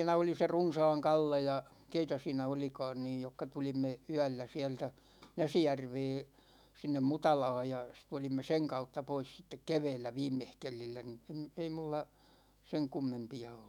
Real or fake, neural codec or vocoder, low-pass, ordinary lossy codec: fake; autoencoder, 48 kHz, 128 numbers a frame, DAC-VAE, trained on Japanese speech; 19.8 kHz; none